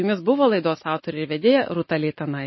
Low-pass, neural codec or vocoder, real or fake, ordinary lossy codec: 7.2 kHz; none; real; MP3, 24 kbps